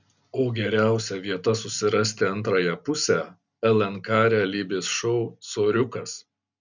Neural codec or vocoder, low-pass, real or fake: none; 7.2 kHz; real